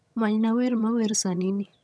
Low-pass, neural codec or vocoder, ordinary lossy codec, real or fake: none; vocoder, 22.05 kHz, 80 mel bands, HiFi-GAN; none; fake